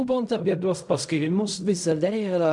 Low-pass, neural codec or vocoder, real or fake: 10.8 kHz; codec, 16 kHz in and 24 kHz out, 0.4 kbps, LongCat-Audio-Codec, fine tuned four codebook decoder; fake